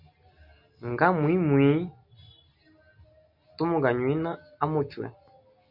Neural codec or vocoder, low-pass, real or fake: none; 5.4 kHz; real